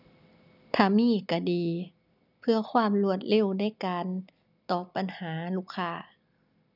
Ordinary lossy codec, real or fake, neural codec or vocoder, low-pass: none; real; none; 5.4 kHz